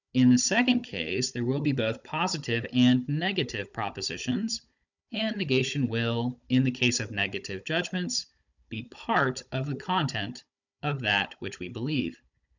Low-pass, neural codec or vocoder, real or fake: 7.2 kHz; codec, 16 kHz, 16 kbps, FunCodec, trained on Chinese and English, 50 frames a second; fake